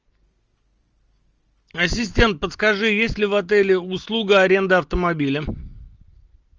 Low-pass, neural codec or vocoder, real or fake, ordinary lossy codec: 7.2 kHz; none; real; Opus, 24 kbps